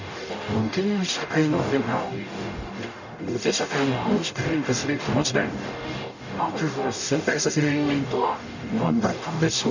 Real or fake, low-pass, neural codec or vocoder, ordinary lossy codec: fake; 7.2 kHz; codec, 44.1 kHz, 0.9 kbps, DAC; none